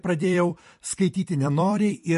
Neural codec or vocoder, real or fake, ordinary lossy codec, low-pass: vocoder, 44.1 kHz, 128 mel bands every 256 samples, BigVGAN v2; fake; MP3, 48 kbps; 14.4 kHz